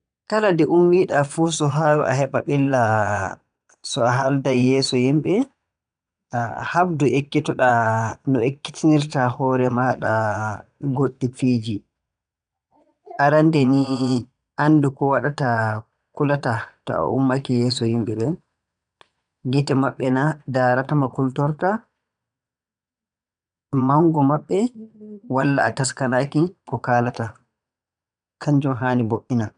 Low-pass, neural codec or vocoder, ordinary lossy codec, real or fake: 9.9 kHz; vocoder, 22.05 kHz, 80 mel bands, Vocos; none; fake